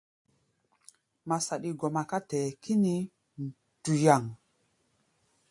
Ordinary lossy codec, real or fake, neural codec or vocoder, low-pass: AAC, 64 kbps; real; none; 10.8 kHz